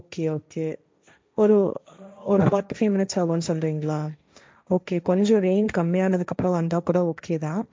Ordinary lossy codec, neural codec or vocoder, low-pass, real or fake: none; codec, 16 kHz, 1.1 kbps, Voila-Tokenizer; none; fake